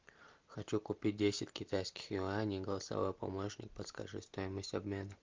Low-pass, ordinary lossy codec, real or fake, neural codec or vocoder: 7.2 kHz; Opus, 32 kbps; real; none